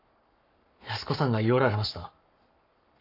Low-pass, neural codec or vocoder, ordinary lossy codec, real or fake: 5.4 kHz; codec, 44.1 kHz, 7.8 kbps, Pupu-Codec; none; fake